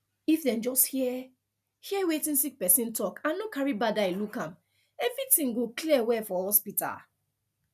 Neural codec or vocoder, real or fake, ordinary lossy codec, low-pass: none; real; none; 14.4 kHz